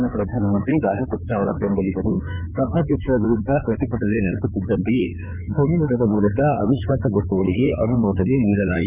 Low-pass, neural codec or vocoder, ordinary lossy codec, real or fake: 3.6 kHz; codec, 16 kHz in and 24 kHz out, 2.2 kbps, FireRedTTS-2 codec; none; fake